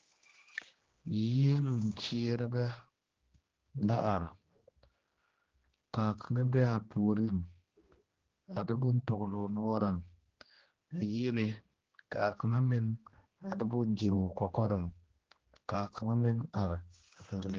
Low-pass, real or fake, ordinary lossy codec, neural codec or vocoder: 7.2 kHz; fake; Opus, 16 kbps; codec, 16 kHz, 1 kbps, X-Codec, HuBERT features, trained on general audio